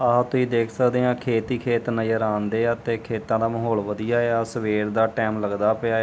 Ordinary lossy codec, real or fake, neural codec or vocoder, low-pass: none; real; none; none